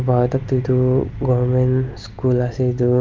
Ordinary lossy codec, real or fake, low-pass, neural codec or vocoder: Opus, 32 kbps; real; 7.2 kHz; none